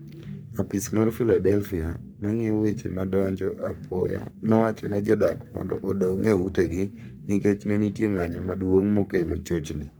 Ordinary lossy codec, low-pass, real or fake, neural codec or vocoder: none; none; fake; codec, 44.1 kHz, 3.4 kbps, Pupu-Codec